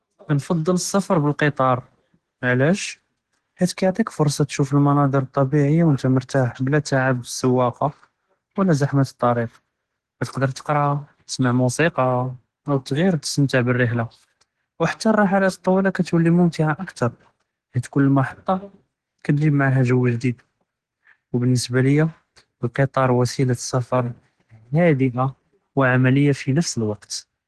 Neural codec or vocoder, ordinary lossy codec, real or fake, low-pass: none; Opus, 16 kbps; real; 10.8 kHz